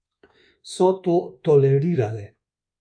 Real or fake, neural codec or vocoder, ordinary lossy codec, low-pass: fake; codec, 24 kHz, 1.2 kbps, DualCodec; MP3, 64 kbps; 9.9 kHz